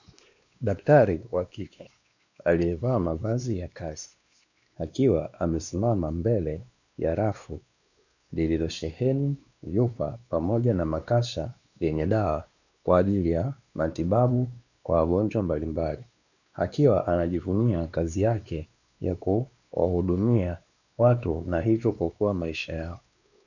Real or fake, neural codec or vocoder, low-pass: fake; codec, 16 kHz, 2 kbps, X-Codec, WavLM features, trained on Multilingual LibriSpeech; 7.2 kHz